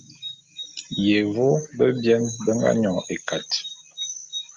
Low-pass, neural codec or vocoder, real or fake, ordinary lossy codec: 7.2 kHz; none; real; Opus, 32 kbps